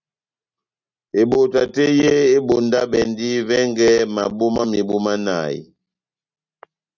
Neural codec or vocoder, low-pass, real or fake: none; 7.2 kHz; real